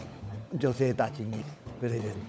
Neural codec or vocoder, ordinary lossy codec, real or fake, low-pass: codec, 16 kHz, 16 kbps, FunCodec, trained on LibriTTS, 50 frames a second; none; fake; none